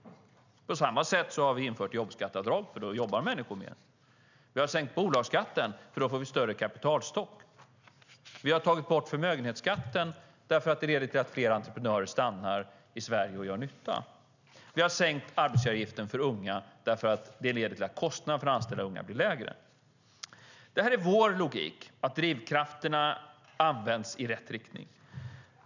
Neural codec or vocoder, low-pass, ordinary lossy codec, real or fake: none; 7.2 kHz; none; real